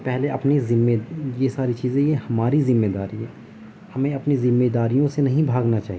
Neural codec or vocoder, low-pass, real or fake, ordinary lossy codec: none; none; real; none